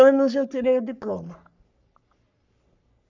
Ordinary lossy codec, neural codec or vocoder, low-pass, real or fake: MP3, 64 kbps; codec, 44.1 kHz, 3.4 kbps, Pupu-Codec; 7.2 kHz; fake